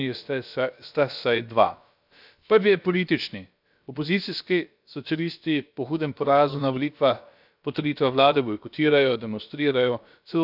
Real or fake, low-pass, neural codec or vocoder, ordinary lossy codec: fake; 5.4 kHz; codec, 16 kHz, about 1 kbps, DyCAST, with the encoder's durations; none